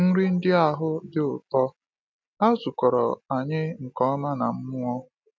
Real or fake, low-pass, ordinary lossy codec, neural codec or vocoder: real; none; none; none